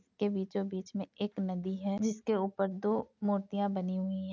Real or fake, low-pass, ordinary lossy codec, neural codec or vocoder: real; 7.2 kHz; none; none